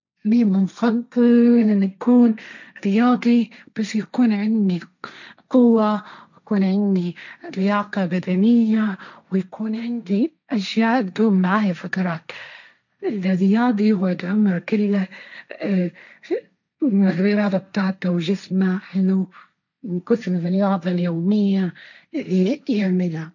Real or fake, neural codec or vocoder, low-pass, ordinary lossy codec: fake; codec, 16 kHz, 1.1 kbps, Voila-Tokenizer; 7.2 kHz; none